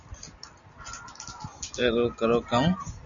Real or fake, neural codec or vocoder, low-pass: real; none; 7.2 kHz